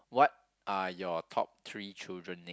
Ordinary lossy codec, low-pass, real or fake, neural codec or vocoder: none; none; real; none